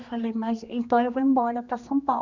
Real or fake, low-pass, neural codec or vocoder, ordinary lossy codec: fake; 7.2 kHz; codec, 16 kHz, 2 kbps, X-Codec, HuBERT features, trained on general audio; Opus, 64 kbps